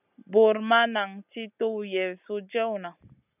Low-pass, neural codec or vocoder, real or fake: 3.6 kHz; none; real